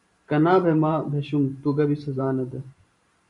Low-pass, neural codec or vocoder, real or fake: 10.8 kHz; vocoder, 44.1 kHz, 128 mel bands every 512 samples, BigVGAN v2; fake